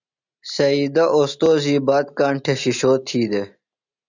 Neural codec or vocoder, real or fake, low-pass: none; real; 7.2 kHz